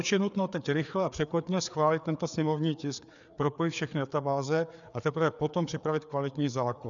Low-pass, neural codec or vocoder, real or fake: 7.2 kHz; codec, 16 kHz, 4 kbps, FreqCodec, larger model; fake